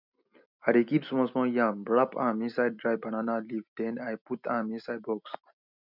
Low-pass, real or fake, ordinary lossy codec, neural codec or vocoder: 5.4 kHz; real; none; none